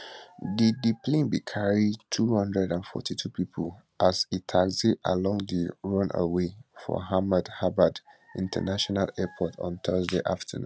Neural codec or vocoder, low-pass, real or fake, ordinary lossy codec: none; none; real; none